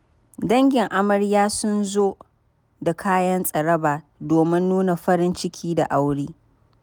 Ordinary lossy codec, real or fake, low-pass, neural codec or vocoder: none; real; none; none